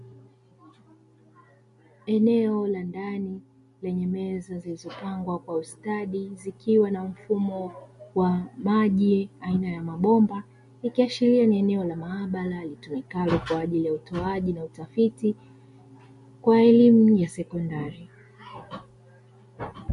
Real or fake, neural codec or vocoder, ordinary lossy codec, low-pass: real; none; MP3, 48 kbps; 10.8 kHz